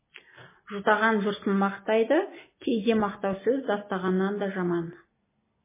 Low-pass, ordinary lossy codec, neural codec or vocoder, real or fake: 3.6 kHz; MP3, 16 kbps; none; real